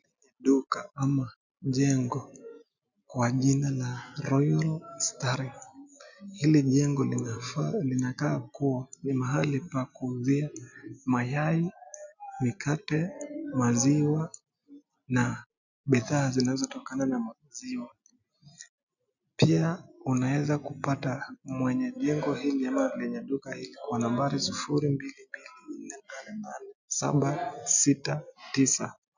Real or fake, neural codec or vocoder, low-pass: real; none; 7.2 kHz